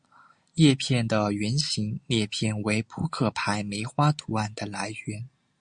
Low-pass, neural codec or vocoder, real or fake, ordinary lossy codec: 9.9 kHz; none; real; Opus, 64 kbps